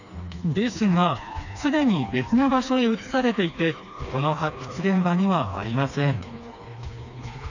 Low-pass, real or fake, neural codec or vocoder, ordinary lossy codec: 7.2 kHz; fake; codec, 16 kHz, 2 kbps, FreqCodec, smaller model; none